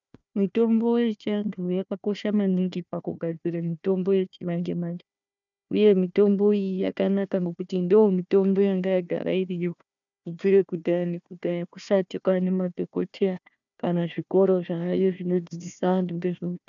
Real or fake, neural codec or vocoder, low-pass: fake; codec, 16 kHz, 1 kbps, FunCodec, trained on Chinese and English, 50 frames a second; 7.2 kHz